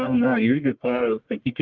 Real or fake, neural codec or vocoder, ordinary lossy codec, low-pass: fake; codec, 44.1 kHz, 1.7 kbps, Pupu-Codec; Opus, 24 kbps; 7.2 kHz